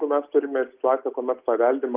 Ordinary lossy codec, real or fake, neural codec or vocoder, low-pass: Opus, 32 kbps; real; none; 3.6 kHz